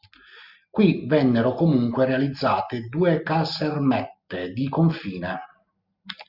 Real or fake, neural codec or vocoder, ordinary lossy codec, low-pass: real; none; Opus, 64 kbps; 5.4 kHz